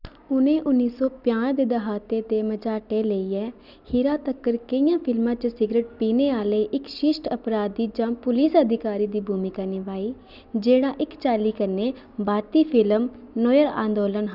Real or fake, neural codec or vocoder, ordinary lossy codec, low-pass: real; none; none; 5.4 kHz